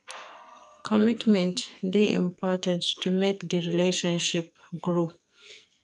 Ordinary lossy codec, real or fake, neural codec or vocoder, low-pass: none; fake; codec, 44.1 kHz, 2.6 kbps, SNAC; 10.8 kHz